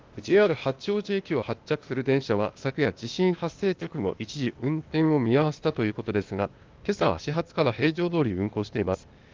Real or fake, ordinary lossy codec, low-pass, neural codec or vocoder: fake; Opus, 32 kbps; 7.2 kHz; codec, 16 kHz, 0.8 kbps, ZipCodec